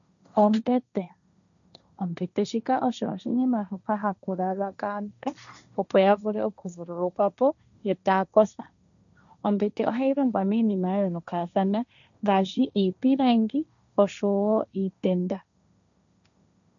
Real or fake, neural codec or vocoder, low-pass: fake; codec, 16 kHz, 1.1 kbps, Voila-Tokenizer; 7.2 kHz